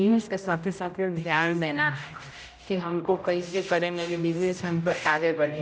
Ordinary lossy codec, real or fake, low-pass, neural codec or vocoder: none; fake; none; codec, 16 kHz, 0.5 kbps, X-Codec, HuBERT features, trained on general audio